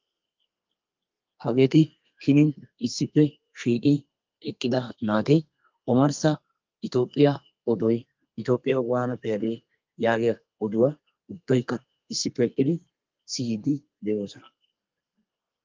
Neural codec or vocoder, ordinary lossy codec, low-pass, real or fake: codec, 32 kHz, 1.9 kbps, SNAC; Opus, 24 kbps; 7.2 kHz; fake